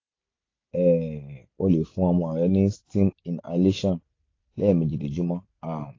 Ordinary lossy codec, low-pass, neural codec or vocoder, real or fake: AAC, 32 kbps; 7.2 kHz; none; real